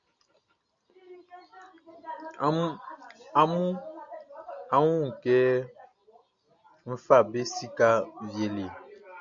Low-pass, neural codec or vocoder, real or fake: 7.2 kHz; none; real